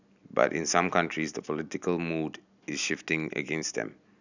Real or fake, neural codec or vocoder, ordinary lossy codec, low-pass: real; none; none; 7.2 kHz